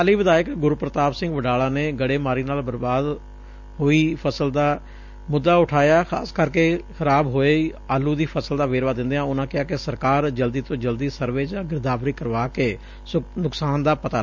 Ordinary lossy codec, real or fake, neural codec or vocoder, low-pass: MP3, 64 kbps; real; none; 7.2 kHz